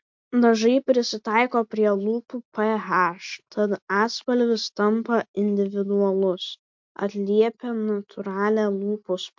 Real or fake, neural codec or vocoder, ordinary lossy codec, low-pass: real; none; MP3, 48 kbps; 7.2 kHz